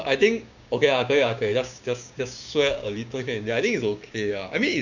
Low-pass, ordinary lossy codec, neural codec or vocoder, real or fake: 7.2 kHz; none; codec, 16 kHz, 6 kbps, DAC; fake